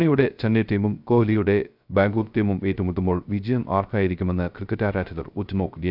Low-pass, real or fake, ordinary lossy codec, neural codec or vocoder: 5.4 kHz; fake; none; codec, 16 kHz, 0.3 kbps, FocalCodec